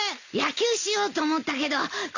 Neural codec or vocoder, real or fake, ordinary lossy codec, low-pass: none; real; none; 7.2 kHz